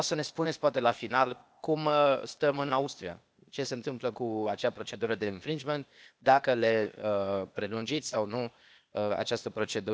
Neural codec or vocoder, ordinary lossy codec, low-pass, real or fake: codec, 16 kHz, 0.8 kbps, ZipCodec; none; none; fake